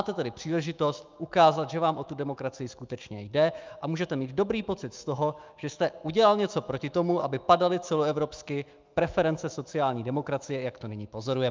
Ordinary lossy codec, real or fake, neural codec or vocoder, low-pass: Opus, 24 kbps; fake; autoencoder, 48 kHz, 128 numbers a frame, DAC-VAE, trained on Japanese speech; 7.2 kHz